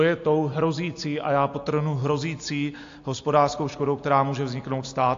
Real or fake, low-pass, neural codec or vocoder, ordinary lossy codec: real; 7.2 kHz; none; AAC, 48 kbps